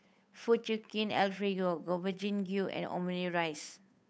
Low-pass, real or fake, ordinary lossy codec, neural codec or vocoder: none; fake; none; codec, 16 kHz, 8 kbps, FunCodec, trained on Chinese and English, 25 frames a second